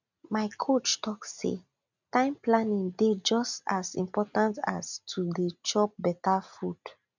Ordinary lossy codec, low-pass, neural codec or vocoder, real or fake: none; 7.2 kHz; none; real